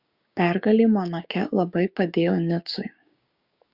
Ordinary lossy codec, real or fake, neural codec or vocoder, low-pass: Opus, 64 kbps; fake; codec, 16 kHz, 6 kbps, DAC; 5.4 kHz